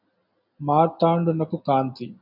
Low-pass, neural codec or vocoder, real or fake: 5.4 kHz; none; real